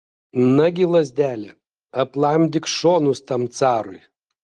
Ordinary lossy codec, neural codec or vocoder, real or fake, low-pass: Opus, 24 kbps; none; real; 10.8 kHz